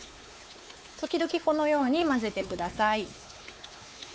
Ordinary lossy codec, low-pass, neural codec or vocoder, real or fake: none; none; codec, 16 kHz, 4 kbps, X-Codec, WavLM features, trained on Multilingual LibriSpeech; fake